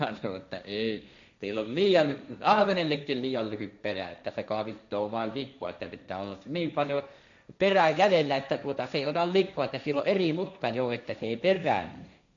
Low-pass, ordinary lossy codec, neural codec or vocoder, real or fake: 7.2 kHz; none; codec, 16 kHz, 1.1 kbps, Voila-Tokenizer; fake